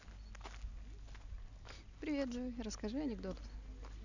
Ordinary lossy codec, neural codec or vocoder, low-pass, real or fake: none; none; 7.2 kHz; real